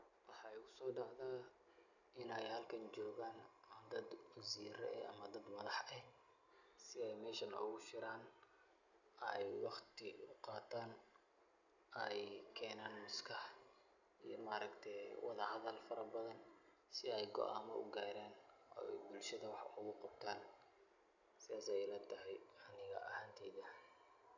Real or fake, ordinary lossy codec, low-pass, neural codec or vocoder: real; none; 7.2 kHz; none